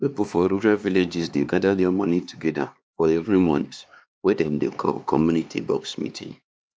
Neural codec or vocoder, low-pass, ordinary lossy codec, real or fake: codec, 16 kHz, 2 kbps, X-Codec, HuBERT features, trained on LibriSpeech; none; none; fake